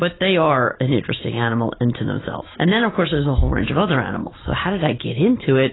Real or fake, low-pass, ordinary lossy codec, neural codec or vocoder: real; 7.2 kHz; AAC, 16 kbps; none